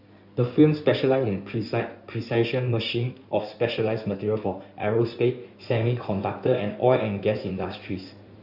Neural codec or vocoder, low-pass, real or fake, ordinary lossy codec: codec, 16 kHz in and 24 kHz out, 2.2 kbps, FireRedTTS-2 codec; 5.4 kHz; fake; none